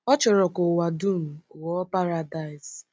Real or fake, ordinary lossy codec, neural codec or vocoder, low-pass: real; none; none; none